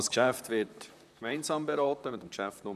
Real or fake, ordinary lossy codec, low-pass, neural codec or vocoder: fake; none; 14.4 kHz; vocoder, 44.1 kHz, 128 mel bands, Pupu-Vocoder